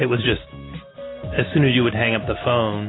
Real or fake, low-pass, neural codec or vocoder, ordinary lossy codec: real; 7.2 kHz; none; AAC, 16 kbps